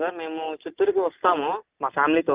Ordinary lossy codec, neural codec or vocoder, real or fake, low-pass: Opus, 24 kbps; none; real; 3.6 kHz